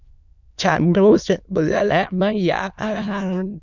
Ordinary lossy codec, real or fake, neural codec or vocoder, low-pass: none; fake; autoencoder, 22.05 kHz, a latent of 192 numbers a frame, VITS, trained on many speakers; 7.2 kHz